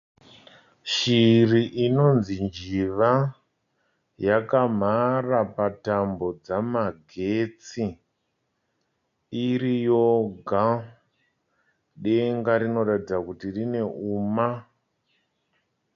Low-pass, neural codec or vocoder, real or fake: 7.2 kHz; none; real